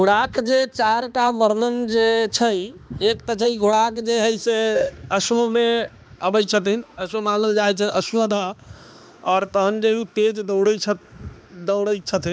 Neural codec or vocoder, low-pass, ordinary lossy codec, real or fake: codec, 16 kHz, 2 kbps, X-Codec, HuBERT features, trained on balanced general audio; none; none; fake